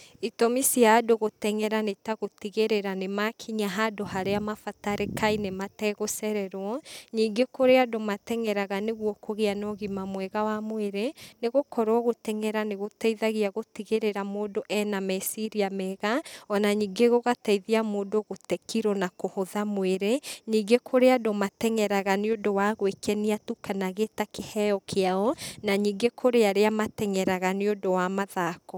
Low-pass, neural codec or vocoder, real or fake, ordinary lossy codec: none; none; real; none